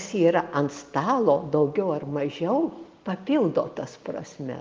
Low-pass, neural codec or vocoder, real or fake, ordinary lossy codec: 7.2 kHz; none; real; Opus, 32 kbps